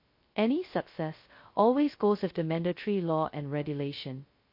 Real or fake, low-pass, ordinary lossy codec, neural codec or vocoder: fake; 5.4 kHz; MP3, 32 kbps; codec, 16 kHz, 0.2 kbps, FocalCodec